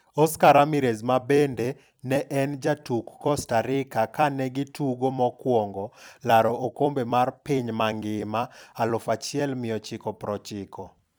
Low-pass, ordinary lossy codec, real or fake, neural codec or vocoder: none; none; fake; vocoder, 44.1 kHz, 128 mel bands every 256 samples, BigVGAN v2